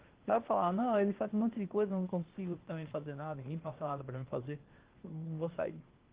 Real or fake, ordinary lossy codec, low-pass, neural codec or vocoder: fake; Opus, 16 kbps; 3.6 kHz; codec, 16 kHz, 0.8 kbps, ZipCodec